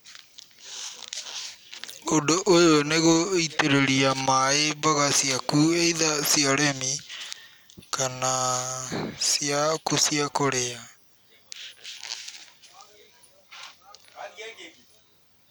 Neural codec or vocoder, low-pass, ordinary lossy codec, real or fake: none; none; none; real